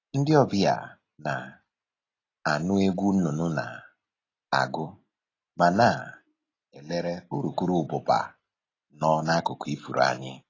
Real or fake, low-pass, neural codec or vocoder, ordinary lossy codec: real; 7.2 kHz; none; AAC, 32 kbps